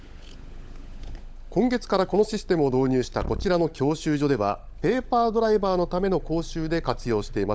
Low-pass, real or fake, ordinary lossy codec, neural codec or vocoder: none; fake; none; codec, 16 kHz, 16 kbps, FunCodec, trained on LibriTTS, 50 frames a second